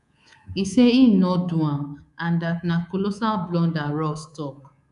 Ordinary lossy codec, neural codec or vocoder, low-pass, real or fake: none; codec, 24 kHz, 3.1 kbps, DualCodec; 10.8 kHz; fake